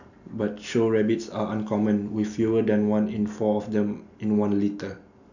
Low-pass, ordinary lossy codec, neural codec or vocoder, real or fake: 7.2 kHz; none; none; real